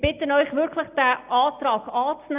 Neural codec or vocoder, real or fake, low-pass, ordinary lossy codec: none; real; 3.6 kHz; Opus, 64 kbps